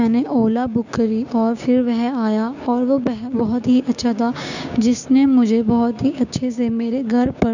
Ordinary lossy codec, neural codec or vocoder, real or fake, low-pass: none; codec, 16 kHz, 6 kbps, DAC; fake; 7.2 kHz